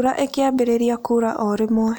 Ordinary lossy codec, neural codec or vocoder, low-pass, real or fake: none; none; none; real